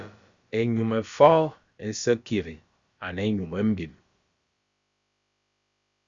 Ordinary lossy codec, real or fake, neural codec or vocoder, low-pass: Opus, 64 kbps; fake; codec, 16 kHz, about 1 kbps, DyCAST, with the encoder's durations; 7.2 kHz